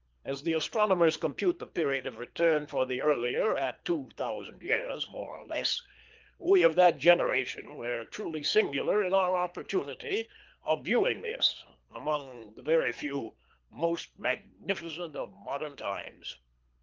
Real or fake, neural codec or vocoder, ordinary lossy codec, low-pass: fake; codec, 24 kHz, 3 kbps, HILCodec; Opus, 24 kbps; 7.2 kHz